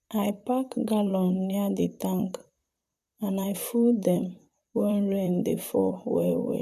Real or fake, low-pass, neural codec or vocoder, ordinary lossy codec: fake; 14.4 kHz; vocoder, 44.1 kHz, 128 mel bands, Pupu-Vocoder; none